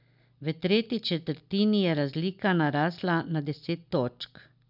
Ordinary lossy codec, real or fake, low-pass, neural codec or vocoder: none; real; 5.4 kHz; none